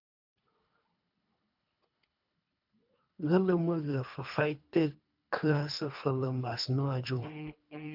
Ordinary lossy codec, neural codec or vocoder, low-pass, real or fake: MP3, 48 kbps; codec, 24 kHz, 3 kbps, HILCodec; 5.4 kHz; fake